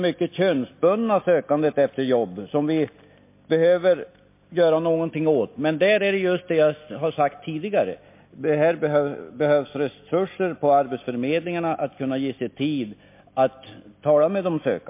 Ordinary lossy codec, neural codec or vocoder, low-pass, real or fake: MP3, 24 kbps; none; 3.6 kHz; real